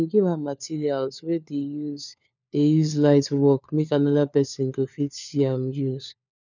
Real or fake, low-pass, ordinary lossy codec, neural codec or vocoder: fake; 7.2 kHz; none; codec, 16 kHz, 4 kbps, FunCodec, trained on LibriTTS, 50 frames a second